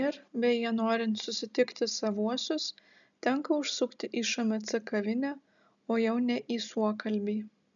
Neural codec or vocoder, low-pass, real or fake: none; 7.2 kHz; real